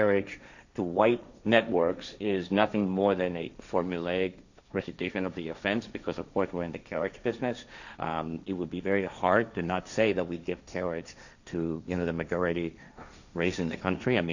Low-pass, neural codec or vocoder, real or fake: 7.2 kHz; codec, 16 kHz, 1.1 kbps, Voila-Tokenizer; fake